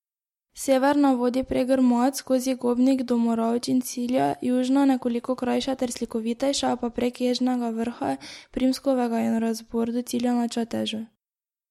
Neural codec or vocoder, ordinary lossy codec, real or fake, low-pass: none; MP3, 64 kbps; real; 19.8 kHz